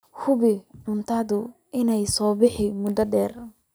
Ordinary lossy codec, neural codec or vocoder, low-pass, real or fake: none; none; none; real